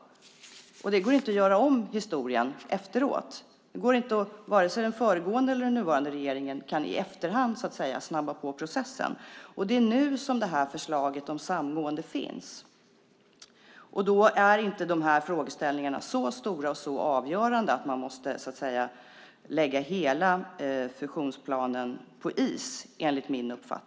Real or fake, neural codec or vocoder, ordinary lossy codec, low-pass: real; none; none; none